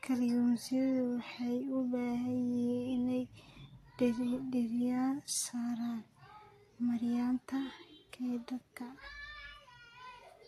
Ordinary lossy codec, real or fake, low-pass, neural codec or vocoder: AAC, 48 kbps; real; 14.4 kHz; none